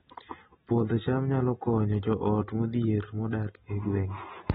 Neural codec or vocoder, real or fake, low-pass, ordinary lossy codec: none; real; 10.8 kHz; AAC, 16 kbps